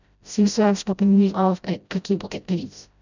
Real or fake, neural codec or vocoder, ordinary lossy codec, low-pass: fake; codec, 16 kHz, 0.5 kbps, FreqCodec, smaller model; none; 7.2 kHz